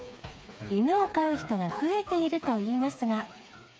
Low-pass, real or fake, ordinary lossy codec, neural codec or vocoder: none; fake; none; codec, 16 kHz, 4 kbps, FreqCodec, smaller model